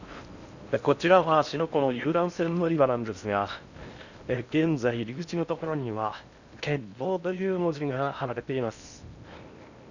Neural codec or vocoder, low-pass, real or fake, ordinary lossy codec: codec, 16 kHz in and 24 kHz out, 0.6 kbps, FocalCodec, streaming, 4096 codes; 7.2 kHz; fake; none